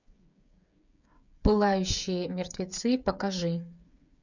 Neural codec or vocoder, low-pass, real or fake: codec, 16 kHz, 8 kbps, FreqCodec, smaller model; 7.2 kHz; fake